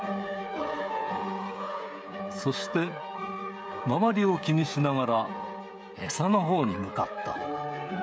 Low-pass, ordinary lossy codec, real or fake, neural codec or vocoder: none; none; fake; codec, 16 kHz, 16 kbps, FreqCodec, smaller model